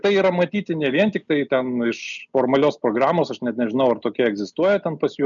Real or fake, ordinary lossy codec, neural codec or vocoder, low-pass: real; MP3, 96 kbps; none; 7.2 kHz